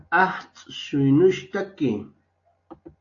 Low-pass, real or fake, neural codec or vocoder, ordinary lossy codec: 7.2 kHz; real; none; AAC, 48 kbps